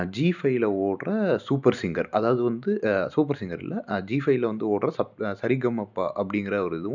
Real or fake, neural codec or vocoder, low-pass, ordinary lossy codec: real; none; 7.2 kHz; none